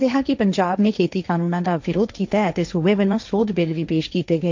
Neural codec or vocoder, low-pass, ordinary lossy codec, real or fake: codec, 16 kHz, 1.1 kbps, Voila-Tokenizer; 7.2 kHz; MP3, 64 kbps; fake